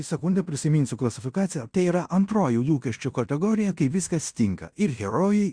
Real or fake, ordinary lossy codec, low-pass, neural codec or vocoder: fake; Opus, 64 kbps; 9.9 kHz; codec, 16 kHz in and 24 kHz out, 0.9 kbps, LongCat-Audio-Codec, fine tuned four codebook decoder